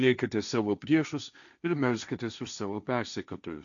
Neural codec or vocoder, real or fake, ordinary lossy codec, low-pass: codec, 16 kHz, 1.1 kbps, Voila-Tokenizer; fake; MP3, 96 kbps; 7.2 kHz